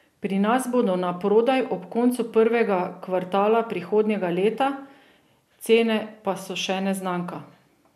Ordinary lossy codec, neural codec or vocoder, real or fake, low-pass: MP3, 96 kbps; none; real; 14.4 kHz